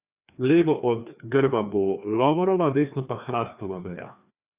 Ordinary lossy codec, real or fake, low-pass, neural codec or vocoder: Opus, 64 kbps; fake; 3.6 kHz; codec, 16 kHz, 2 kbps, FreqCodec, larger model